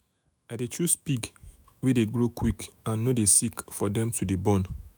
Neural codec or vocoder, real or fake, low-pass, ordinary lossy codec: autoencoder, 48 kHz, 128 numbers a frame, DAC-VAE, trained on Japanese speech; fake; none; none